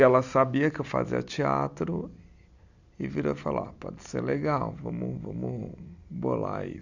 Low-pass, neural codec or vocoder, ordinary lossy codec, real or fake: 7.2 kHz; none; none; real